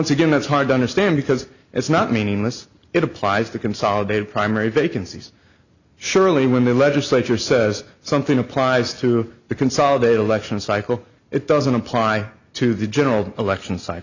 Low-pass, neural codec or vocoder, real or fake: 7.2 kHz; none; real